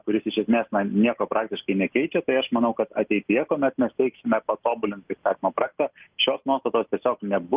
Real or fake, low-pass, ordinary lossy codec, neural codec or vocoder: real; 3.6 kHz; Opus, 24 kbps; none